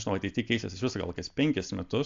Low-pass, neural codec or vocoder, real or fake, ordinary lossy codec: 7.2 kHz; none; real; AAC, 64 kbps